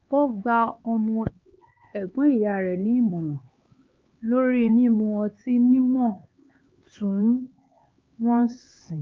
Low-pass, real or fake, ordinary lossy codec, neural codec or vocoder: 7.2 kHz; fake; Opus, 24 kbps; codec, 16 kHz, 4 kbps, X-Codec, HuBERT features, trained on LibriSpeech